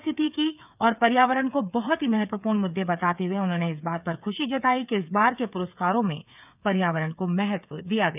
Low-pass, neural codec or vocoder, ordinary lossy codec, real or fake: 3.6 kHz; codec, 16 kHz, 8 kbps, FreqCodec, smaller model; none; fake